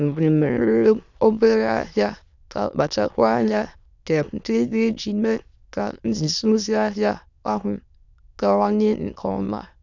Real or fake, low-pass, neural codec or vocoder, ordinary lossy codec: fake; 7.2 kHz; autoencoder, 22.05 kHz, a latent of 192 numbers a frame, VITS, trained on many speakers; none